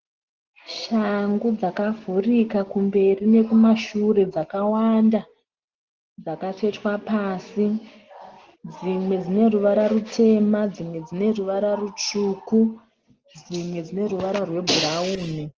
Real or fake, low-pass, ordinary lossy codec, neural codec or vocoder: real; 7.2 kHz; Opus, 16 kbps; none